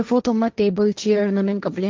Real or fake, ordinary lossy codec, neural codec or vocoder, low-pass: fake; Opus, 24 kbps; codec, 16 kHz, 1.1 kbps, Voila-Tokenizer; 7.2 kHz